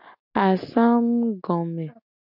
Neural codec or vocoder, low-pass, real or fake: none; 5.4 kHz; real